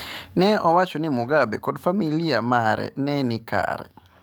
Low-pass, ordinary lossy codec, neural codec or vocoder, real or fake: none; none; codec, 44.1 kHz, 7.8 kbps, DAC; fake